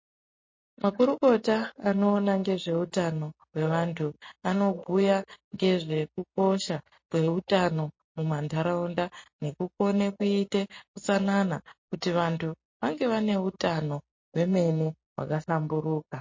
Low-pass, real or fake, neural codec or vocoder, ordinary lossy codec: 7.2 kHz; real; none; MP3, 32 kbps